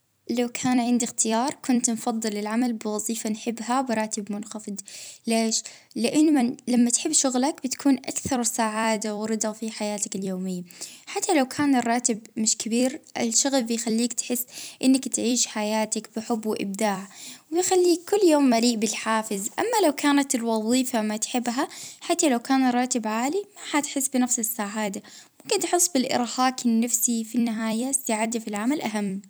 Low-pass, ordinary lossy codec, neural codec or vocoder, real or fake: none; none; vocoder, 44.1 kHz, 128 mel bands every 256 samples, BigVGAN v2; fake